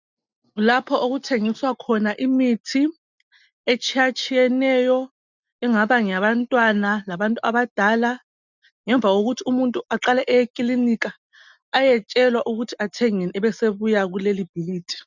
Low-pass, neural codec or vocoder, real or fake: 7.2 kHz; none; real